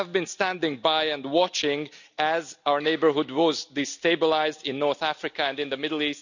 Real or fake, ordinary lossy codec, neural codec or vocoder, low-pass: real; none; none; 7.2 kHz